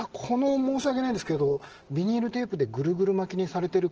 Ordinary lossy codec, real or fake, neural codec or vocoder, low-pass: Opus, 16 kbps; real; none; 7.2 kHz